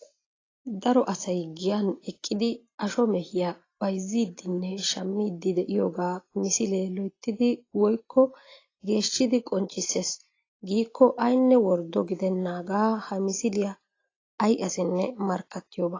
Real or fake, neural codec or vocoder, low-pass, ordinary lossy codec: real; none; 7.2 kHz; AAC, 32 kbps